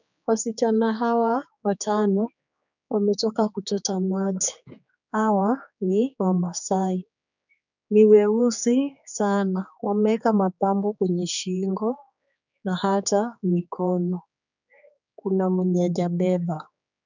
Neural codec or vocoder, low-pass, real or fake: codec, 16 kHz, 4 kbps, X-Codec, HuBERT features, trained on general audio; 7.2 kHz; fake